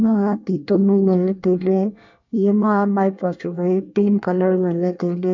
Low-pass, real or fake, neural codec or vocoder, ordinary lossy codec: 7.2 kHz; fake; codec, 24 kHz, 1 kbps, SNAC; none